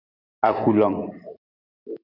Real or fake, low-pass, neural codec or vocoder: fake; 5.4 kHz; vocoder, 44.1 kHz, 128 mel bands every 256 samples, BigVGAN v2